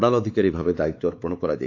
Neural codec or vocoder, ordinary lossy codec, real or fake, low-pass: codec, 16 kHz, 4 kbps, X-Codec, WavLM features, trained on Multilingual LibriSpeech; AAC, 48 kbps; fake; 7.2 kHz